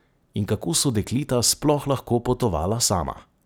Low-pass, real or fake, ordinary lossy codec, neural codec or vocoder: none; real; none; none